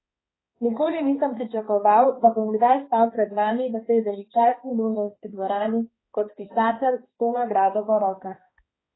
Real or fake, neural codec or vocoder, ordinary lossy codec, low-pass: fake; codec, 16 kHz, 2 kbps, X-Codec, HuBERT features, trained on balanced general audio; AAC, 16 kbps; 7.2 kHz